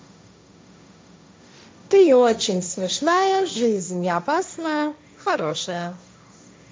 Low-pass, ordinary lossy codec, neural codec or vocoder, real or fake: none; none; codec, 16 kHz, 1.1 kbps, Voila-Tokenizer; fake